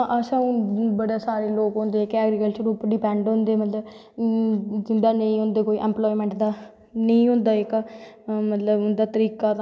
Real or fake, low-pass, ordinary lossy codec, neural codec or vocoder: real; none; none; none